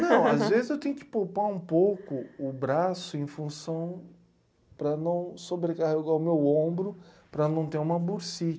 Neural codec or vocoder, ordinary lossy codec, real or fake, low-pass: none; none; real; none